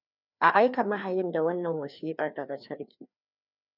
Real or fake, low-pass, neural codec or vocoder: fake; 5.4 kHz; codec, 16 kHz, 2 kbps, FreqCodec, larger model